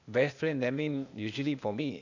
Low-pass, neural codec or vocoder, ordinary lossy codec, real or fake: 7.2 kHz; codec, 16 kHz, 0.8 kbps, ZipCodec; none; fake